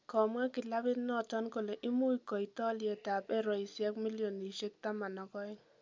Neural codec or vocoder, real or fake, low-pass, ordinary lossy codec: none; real; 7.2 kHz; MP3, 64 kbps